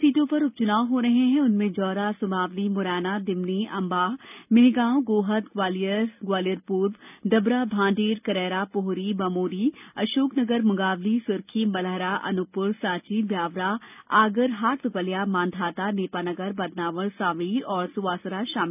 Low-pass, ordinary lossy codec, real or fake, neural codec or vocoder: 3.6 kHz; none; real; none